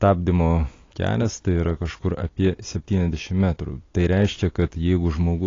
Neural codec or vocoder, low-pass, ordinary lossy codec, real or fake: none; 7.2 kHz; AAC, 32 kbps; real